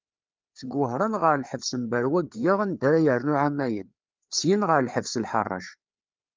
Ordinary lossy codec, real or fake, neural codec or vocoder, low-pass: Opus, 24 kbps; fake; codec, 16 kHz, 4 kbps, FreqCodec, larger model; 7.2 kHz